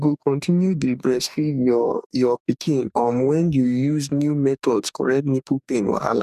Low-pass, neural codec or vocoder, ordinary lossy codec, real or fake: 14.4 kHz; codec, 44.1 kHz, 2.6 kbps, DAC; none; fake